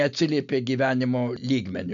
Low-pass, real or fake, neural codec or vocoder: 7.2 kHz; real; none